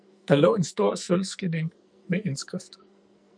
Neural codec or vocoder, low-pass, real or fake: codec, 44.1 kHz, 2.6 kbps, SNAC; 9.9 kHz; fake